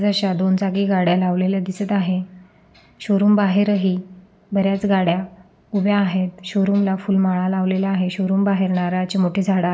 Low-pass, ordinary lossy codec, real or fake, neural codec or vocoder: none; none; real; none